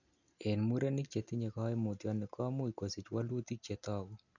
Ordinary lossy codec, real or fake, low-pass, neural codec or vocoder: none; real; 7.2 kHz; none